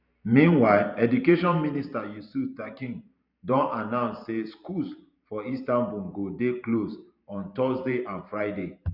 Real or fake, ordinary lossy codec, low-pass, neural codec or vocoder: fake; none; 5.4 kHz; vocoder, 44.1 kHz, 128 mel bands every 512 samples, BigVGAN v2